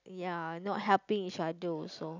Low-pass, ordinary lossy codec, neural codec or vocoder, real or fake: 7.2 kHz; none; none; real